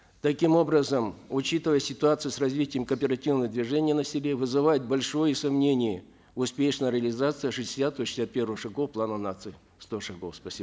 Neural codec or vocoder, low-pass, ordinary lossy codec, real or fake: none; none; none; real